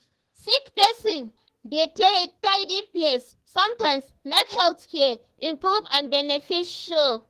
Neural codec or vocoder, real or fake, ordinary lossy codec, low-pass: codec, 32 kHz, 1.9 kbps, SNAC; fake; Opus, 16 kbps; 14.4 kHz